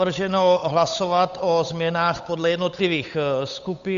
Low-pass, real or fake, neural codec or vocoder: 7.2 kHz; fake; codec, 16 kHz, 8 kbps, FunCodec, trained on Chinese and English, 25 frames a second